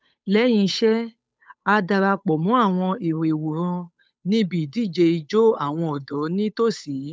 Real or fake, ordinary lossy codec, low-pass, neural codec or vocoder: fake; none; none; codec, 16 kHz, 8 kbps, FunCodec, trained on Chinese and English, 25 frames a second